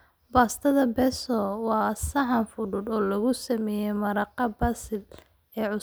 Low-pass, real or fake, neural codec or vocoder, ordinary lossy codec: none; real; none; none